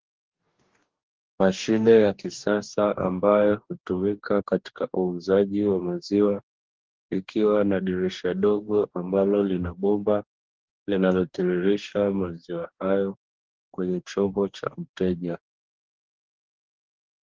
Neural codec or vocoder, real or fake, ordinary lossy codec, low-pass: codec, 44.1 kHz, 2.6 kbps, DAC; fake; Opus, 16 kbps; 7.2 kHz